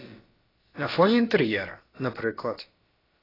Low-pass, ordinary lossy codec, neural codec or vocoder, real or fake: 5.4 kHz; AAC, 24 kbps; codec, 16 kHz, about 1 kbps, DyCAST, with the encoder's durations; fake